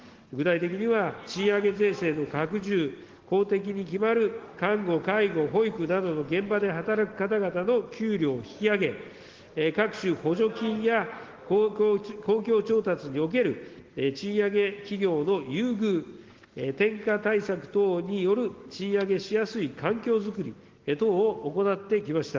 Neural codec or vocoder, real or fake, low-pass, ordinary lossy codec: none; real; 7.2 kHz; Opus, 16 kbps